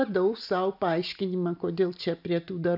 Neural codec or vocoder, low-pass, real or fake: none; 5.4 kHz; real